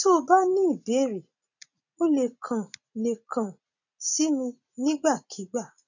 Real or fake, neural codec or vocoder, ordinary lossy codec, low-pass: fake; vocoder, 44.1 kHz, 80 mel bands, Vocos; none; 7.2 kHz